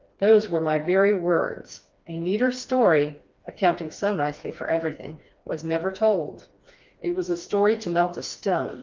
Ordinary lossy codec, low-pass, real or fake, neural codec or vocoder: Opus, 24 kbps; 7.2 kHz; fake; codec, 16 kHz, 2 kbps, FreqCodec, smaller model